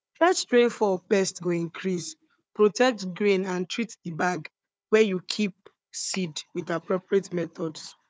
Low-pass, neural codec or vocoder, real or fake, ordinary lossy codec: none; codec, 16 kHz, 4 kbps, FunCodec, trained on Chinese and English, 50 frames a second; fake; none